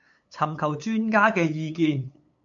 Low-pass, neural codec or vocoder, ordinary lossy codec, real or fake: 7.2 kHz; codec, 16 kHz, 8 kbps, FunCodec, trained on LibriTTS, 25 frames a second; MP3, 48 kbps; fake